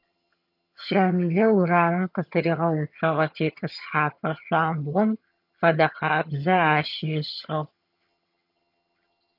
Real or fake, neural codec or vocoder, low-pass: fake; vocoder, 22.05 kHz, 80 mel bands, HiFi-GAN; 5.4 kHz